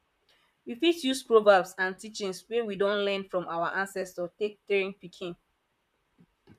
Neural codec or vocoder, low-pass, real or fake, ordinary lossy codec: vocoder, 44.1 kHz, 128 mel bands, Pupu-Vocoder; 14.4 kHz; fake; MP3, 96 kbps